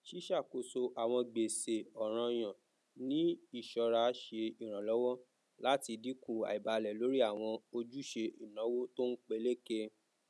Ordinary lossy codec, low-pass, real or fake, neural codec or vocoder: none; none; real; none